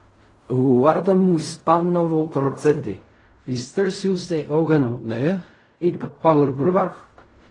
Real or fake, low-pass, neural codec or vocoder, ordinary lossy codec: fake; 10.8 kHz; codec, 16 kHz in and 24 kHz out, 0.4 kbps, LongCat-Audio-Codec, fine tuned four codebook decoder; AAC, 32 kbps